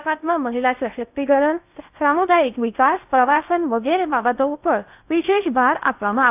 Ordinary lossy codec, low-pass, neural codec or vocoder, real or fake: AAC, 32 kbps; 3.6 kHz; codec, 16 kHz in and 24 kHz out, 0.6 kbps, FocalCodec, streaming, 2048 codes; fake